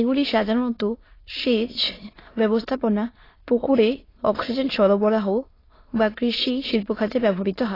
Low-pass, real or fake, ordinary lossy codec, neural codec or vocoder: 5.4 kHz; fake; AAC, 24 kbps; autoencoder, 22.05 kHz, a latent of 192 numbers a frame, VITS, trained on many speakers